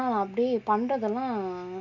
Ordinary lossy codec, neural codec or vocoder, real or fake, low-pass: none; none; real; 7.2 kHz